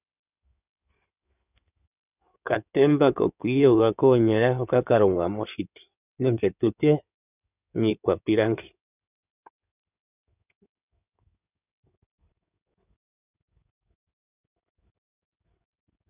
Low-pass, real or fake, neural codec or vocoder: 3.6 kHz; fake; codec, 16 kHz in and 24 kHz out, 2.2 kbps, FireRedTTS-2 codec